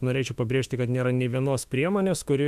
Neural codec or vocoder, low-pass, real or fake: autoencoder, 48 kHz, 32 numbers a frame, DAC-VAE, trained on Japanese speech; 14.4 kHz; fake